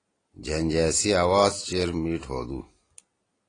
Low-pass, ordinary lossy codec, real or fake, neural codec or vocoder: 9.9 kHz; AAC, 32 kbps; real; none